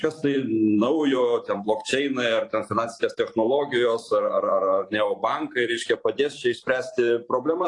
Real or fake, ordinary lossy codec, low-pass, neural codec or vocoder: fake; AAC, 48 kbps; 10.8 kHz; vocoder, 44.1 kHz, 128 mel bands every 512 samples, BigVGAN v2